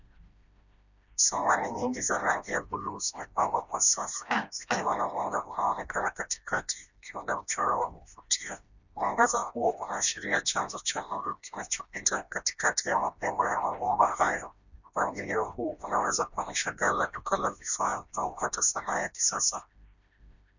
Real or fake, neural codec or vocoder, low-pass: fake; codec, 16 kHz, 1 kbps, FreqCodec, smaller model; 7.2 kHz